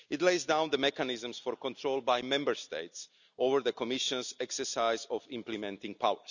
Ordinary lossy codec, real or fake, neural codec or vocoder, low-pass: none; real; none; 7.2 kHz